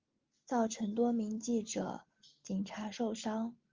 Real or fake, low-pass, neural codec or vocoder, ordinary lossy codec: real; 7.2 kHz; none; Opus, 32 kbps